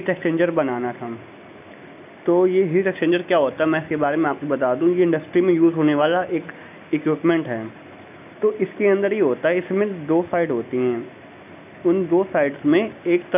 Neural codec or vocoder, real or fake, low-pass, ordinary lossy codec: none; real; 3.6 kHz; none